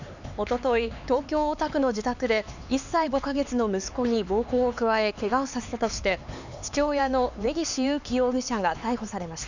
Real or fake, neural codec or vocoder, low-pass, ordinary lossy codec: fake; codec, 16 kHz, 4 kbps, X-Codec, HuBERT features, trained on LibriSpeech; 7.2 kHz; none